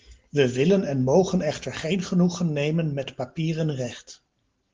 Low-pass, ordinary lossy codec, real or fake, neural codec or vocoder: 7.2 kHz; Opus, 16 kbps; real; none